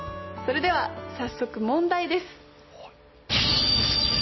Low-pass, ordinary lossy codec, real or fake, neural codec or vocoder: 7.2 kHz; MP3, 24 kbps; real; none